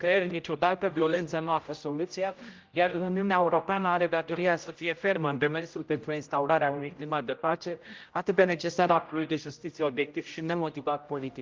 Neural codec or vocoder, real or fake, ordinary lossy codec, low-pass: codec, 16 kHz, 0.5 kbps, X-Codec, HuBERT features, trained on general audio; fake; Opus, 24 kbps; 7.2 kHz